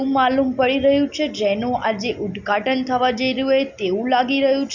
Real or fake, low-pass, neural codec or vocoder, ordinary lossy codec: real; 7.2 kHz; none; none